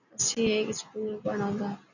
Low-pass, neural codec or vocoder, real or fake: 7.2 kHz; none; real